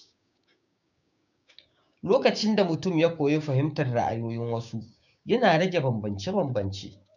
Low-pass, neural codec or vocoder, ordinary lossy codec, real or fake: 7.2 kHz; codec, 16 kHz, 6 kbps, DAC; none; fake